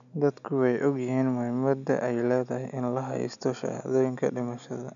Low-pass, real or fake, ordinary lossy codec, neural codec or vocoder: 7.2 kHz; real; none; none